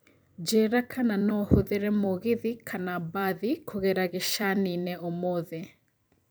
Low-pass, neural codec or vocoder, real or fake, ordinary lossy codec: none; vocoder, 44.1 kHz, 128 mel bands every 256 samples, BigVGAN v2; fake; none